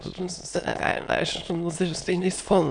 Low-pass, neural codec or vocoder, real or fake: 9.9 kHz; autoencoder, 22.05 kHz, a latent of 192 numbers a frame, VITS, trained on many speakers; fake